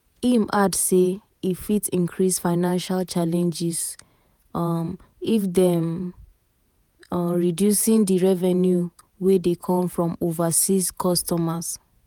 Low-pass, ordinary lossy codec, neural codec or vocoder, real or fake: none; none; vocoder, 48 kHz, 128 mel bands, Vocos; fake